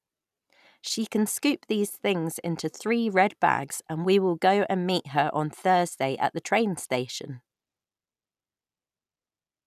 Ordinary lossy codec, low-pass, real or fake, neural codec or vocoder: none; 14.4 kHz; real; none